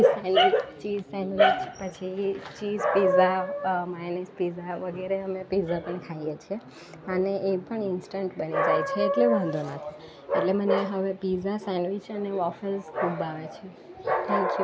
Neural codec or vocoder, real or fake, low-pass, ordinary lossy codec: none; real; none; none